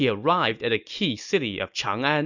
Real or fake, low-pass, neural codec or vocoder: real; 7.2 kHz; none